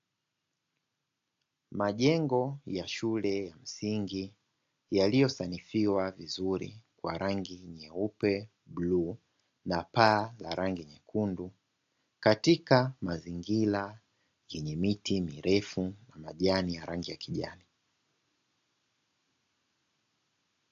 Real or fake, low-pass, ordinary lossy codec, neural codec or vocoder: real; 7.2 kHz; AAC, 48 kbps; none